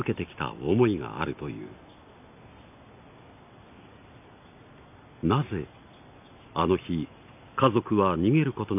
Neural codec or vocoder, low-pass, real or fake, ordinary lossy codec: none; 3.6 kHz; real; none